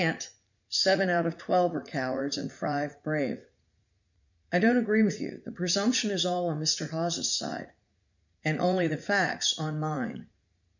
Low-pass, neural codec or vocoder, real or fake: 7.2 kHz; vocoder, 44.1 kHz, 80 mel bands, Vocos; fake